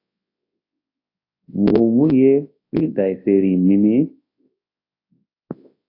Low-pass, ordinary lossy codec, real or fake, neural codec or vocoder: 5.4 kHz; AAC, 32 kbps; fake; codec, 24 kHz, 0.9 kbps, WavTokenizer, large speech release